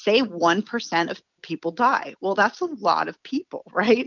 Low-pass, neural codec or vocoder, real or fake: 7.2 kHz; none; real